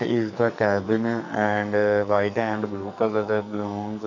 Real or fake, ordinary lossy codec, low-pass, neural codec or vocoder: fake; none; 7.2 kHz; codec, 44.1 kHz, 2.6 kbps, SNAC